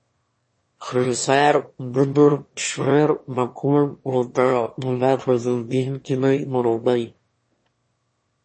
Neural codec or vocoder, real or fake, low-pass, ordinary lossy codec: autoencoder, 22.05 kHz, a latent of 192 numbers a frame, VITS, trained on one speaker; fake; 9.9 kHz; MP3, 32 kbps